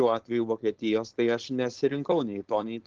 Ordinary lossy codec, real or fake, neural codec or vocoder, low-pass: Opus, 16 kbps; fake; codec, 16 kHz, 4.8 kbps, FACodec; 7.2 kHz